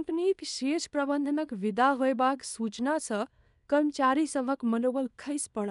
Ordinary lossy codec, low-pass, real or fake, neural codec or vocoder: none; 10.8 kHz; fake; codec, 24 kHz, 0.9 kbps, WavTokenizer, small release